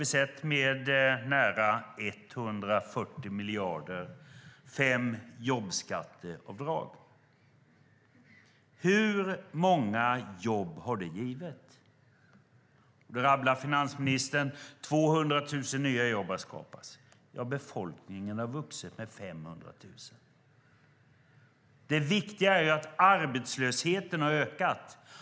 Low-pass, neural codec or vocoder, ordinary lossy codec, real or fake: none; none; none; real